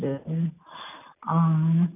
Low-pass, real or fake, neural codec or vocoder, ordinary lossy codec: 3.6 kHz; real; none; none